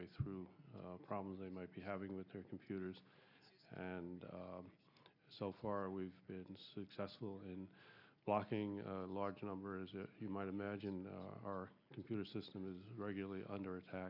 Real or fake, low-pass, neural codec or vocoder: real; 5.4 kHz; none